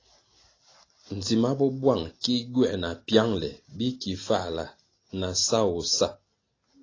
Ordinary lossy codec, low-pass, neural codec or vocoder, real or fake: AAC, 32 kbps; 7.2 kHz; none; real